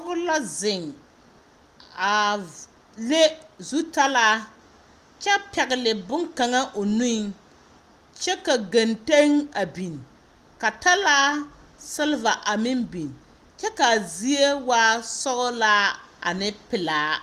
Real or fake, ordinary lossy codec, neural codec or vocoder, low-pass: real; Opus, 32 kbps; none; 14.4 kHz